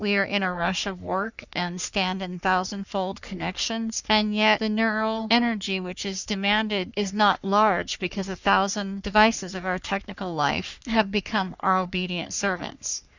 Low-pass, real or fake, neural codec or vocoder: 7.2 kHz; fake; codec, 44.1 kHz, 3.4 kbps, Pupu-Codec